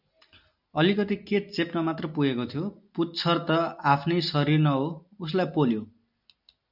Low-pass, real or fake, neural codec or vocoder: 5.4 kHz; real; none